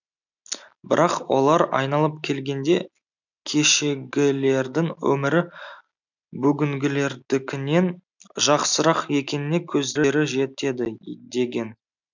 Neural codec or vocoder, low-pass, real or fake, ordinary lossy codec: none; 7.2 kHz; real; none